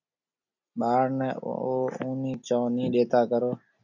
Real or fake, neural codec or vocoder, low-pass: real; none; 7.2 kHz